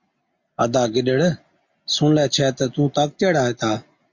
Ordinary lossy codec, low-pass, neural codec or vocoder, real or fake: MP3, 48 kbps; 7.2 kHz; none; real